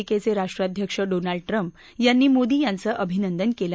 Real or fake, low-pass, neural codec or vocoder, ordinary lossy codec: real; none; none; none